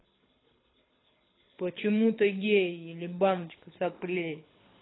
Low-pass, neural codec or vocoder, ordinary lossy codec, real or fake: 7.2 kHz; codec, 24 kHz, 6 kbps, HILCodec; AAC, 16 kbps; fake